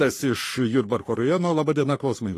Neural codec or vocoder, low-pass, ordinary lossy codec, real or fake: codec, 44.1 kHz, 3.4 kbps, Pupu-Codec; 14.4 kHz; AAC, 48 kbps; fake